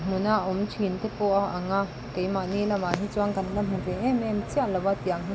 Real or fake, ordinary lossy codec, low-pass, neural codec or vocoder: real; none; none; none